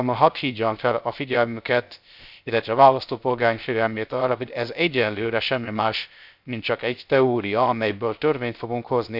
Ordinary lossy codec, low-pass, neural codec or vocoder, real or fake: none; 5.4 kHz; codec, 16 kHz, 0.3 kbps, FocalCodec; fake